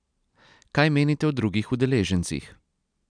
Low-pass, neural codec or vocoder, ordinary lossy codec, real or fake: 9.9 kHz; none; none; real